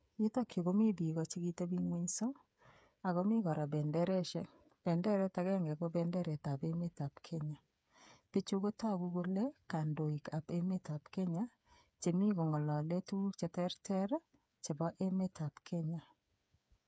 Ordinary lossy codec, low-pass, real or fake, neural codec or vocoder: none; none; fake; codec, 16 kHz, 8 kbps, FreqCodec, smaller model